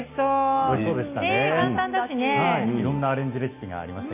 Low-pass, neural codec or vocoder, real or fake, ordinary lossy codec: 3.6 kHz; none; real; AAC, 24 kbps